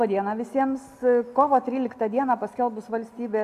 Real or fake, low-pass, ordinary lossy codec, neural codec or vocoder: real; 14.4 kHz; AAC, 96 kbps; none